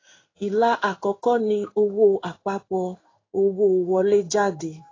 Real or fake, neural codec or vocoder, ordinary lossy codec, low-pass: fake; codec, 16 kHz in and 24 kHz out, 1 kbps, XY-Tokenizer; AAC, 32 kbps; 7.2 kHz